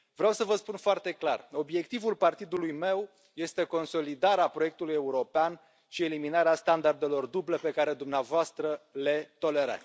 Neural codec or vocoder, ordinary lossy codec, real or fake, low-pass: none; none; real; none